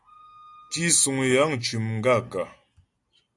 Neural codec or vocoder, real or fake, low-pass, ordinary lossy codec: none; real; 10.8 kHz; AAC, 64 kbps